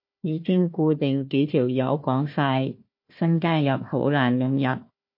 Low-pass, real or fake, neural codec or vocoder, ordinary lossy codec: 5.4 kHz; fake; codec, 16 kHz, 1 kbps, FunCodec, trained on Chinese and English, 50 frames a second; MP3, 32 kbps